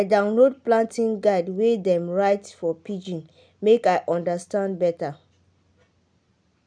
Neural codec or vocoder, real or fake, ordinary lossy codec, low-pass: none; real; none; 9.9 kHz